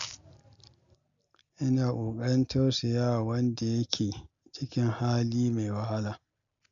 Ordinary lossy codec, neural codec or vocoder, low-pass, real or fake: MP3, 64 kbps; none; 7.2 kHz; real